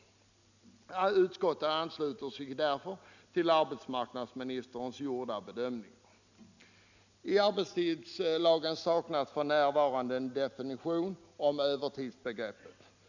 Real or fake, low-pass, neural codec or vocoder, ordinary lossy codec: real; 7.2 kHz; none; none